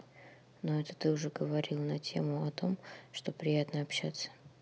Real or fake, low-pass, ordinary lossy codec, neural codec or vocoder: real; none; none; none